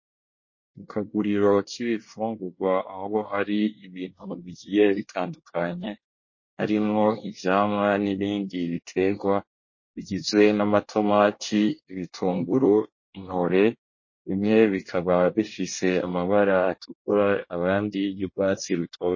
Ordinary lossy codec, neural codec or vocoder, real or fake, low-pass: MP3, 32 kbps; codec, 24 kHz, 1 kbps, SNAC; fake; 7.2 kHz